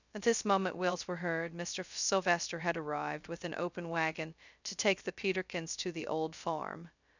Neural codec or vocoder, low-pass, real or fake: codec, 16 kHz, 0.2 kbps, FocalCodec; 7.2 kHz; fake